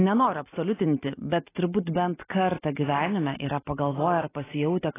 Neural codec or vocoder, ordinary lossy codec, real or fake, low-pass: none; AAC, 16 kbps; real; 3.6 kHz